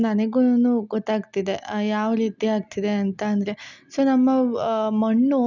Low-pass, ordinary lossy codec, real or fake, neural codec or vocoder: 7.2 kHz; none; real; none